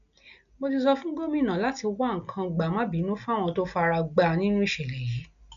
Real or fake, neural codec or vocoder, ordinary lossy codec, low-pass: real; none; MP3, 96 kbps; 7.2 kHz